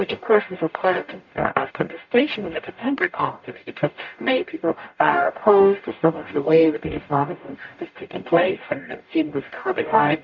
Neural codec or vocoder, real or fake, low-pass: codec, 44.1 kHz, 0.9 kbps, DAC; fake; 7.2 kHz